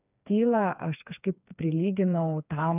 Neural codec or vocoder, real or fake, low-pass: codec, 16 kHz, 4 kbps, FreqCodec, smaller model; fake; 3.6 kHz